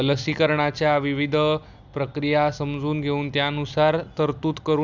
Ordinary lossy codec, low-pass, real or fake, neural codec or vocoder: none; 7.2 kHz; real; none